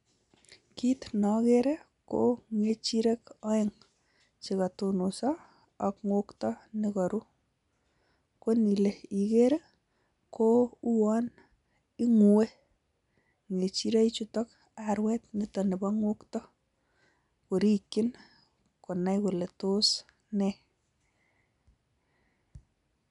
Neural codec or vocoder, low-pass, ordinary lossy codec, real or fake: none; 10.8 kHz; none; real